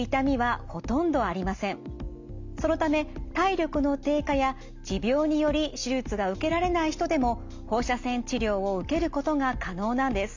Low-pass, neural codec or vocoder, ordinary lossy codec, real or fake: 7.2 kHz; none; MP3, 64 kbps; real